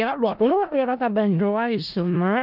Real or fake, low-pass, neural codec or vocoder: fake; 5.4 kHz; codec, 16 kHz in and 24 kHz out, 0.4 kbps, LongCat-Audio-Codec, four codebook decoder